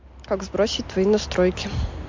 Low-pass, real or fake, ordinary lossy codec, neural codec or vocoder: 7.2 kHz; real; MP3, 48 kbps; none